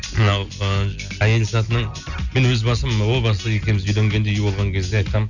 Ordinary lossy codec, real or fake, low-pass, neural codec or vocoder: none; real; 7.2 kHz; none